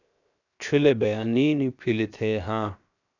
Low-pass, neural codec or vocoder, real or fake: 7.2 kHz; codec, 16 kHz, 0.7 kbps, FocalCodec; fake